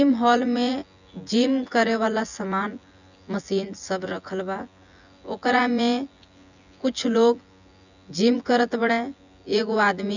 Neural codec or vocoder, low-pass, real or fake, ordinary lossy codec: vocoder, 24 kHz, 100 mel bands, Vocos; 7.2 kHz; fake; none